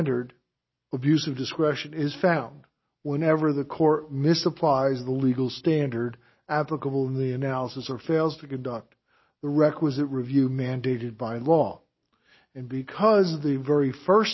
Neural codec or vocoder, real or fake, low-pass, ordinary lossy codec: none; real; 7.2 kHz; MP3, 24 kbps